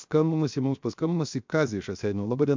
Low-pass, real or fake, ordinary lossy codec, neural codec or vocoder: 7.2 kHz; fake; MP3, 64 kbps; codec, 16 kHz, 0.7 kbps, FocalCodec